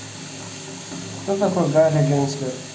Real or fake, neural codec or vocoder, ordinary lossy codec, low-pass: real; none; none; none